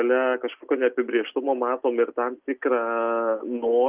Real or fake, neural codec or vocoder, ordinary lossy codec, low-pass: real; none; Opus, 32 kbps; 3.6 kHz